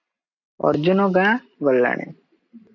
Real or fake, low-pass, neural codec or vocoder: real; 7.2 kHz; none